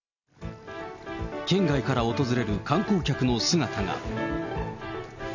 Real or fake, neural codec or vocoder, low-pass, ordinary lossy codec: real; none; 7.2 kHz; none